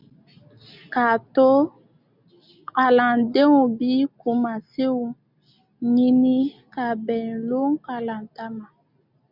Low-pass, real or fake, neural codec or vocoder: 5.4 kHz; real; none